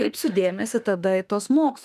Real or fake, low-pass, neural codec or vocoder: fake; 14.4 kHz; autoencoder, 48 kHz, 32 numbers a frame, DAC-VAE, trained on Japanese speech